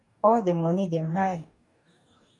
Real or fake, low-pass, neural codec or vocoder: fake; 10.8 kHz; codec, 44.1 kHz, 2.6 kbps, DAC